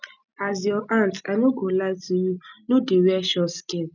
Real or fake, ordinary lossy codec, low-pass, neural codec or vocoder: real; none; 7.2 kHz; none